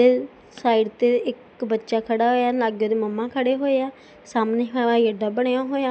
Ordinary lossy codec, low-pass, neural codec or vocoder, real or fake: none; none; none; real